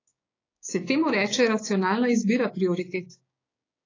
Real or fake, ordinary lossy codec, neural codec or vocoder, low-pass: fake; AAC, 32 kbps; codec, 16 kHz, 6 kbps, DAC; 7.2 kHz